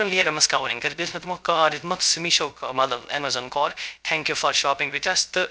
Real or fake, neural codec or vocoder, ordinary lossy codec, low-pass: fake; codec, 16 kHz, 0.3 kbps, FocalCodec; none; none